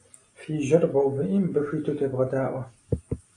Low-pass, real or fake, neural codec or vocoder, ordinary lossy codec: 10.8 kHz; real; none; AAC, 48 kbps